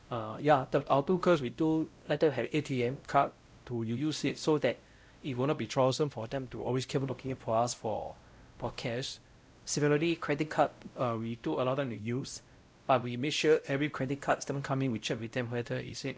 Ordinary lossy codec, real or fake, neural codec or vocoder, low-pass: none; fake; codec, 16 kHz, 0.5 kbps, X-Codec, WavLM features, trained on Multilingual LibriSpeech; none